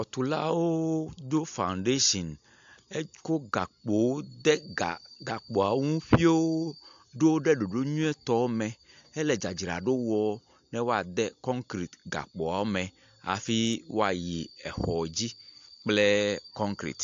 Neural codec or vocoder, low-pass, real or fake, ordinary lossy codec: none; 7.2 kHz; real; AAC, 64 kbps